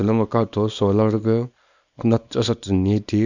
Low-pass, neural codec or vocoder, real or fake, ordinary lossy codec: 7.2 kHz; codec, 24 kHz, 0.9 kbps, WavTokenizer, small release; fake; none